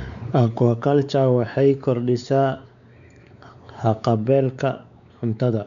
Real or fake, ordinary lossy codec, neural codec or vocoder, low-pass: fake; none; codec, 16 kHz, 4 kbps, X-Codec, WavLM features, trained on Multilingual LibriSpeech; 7.2 kHz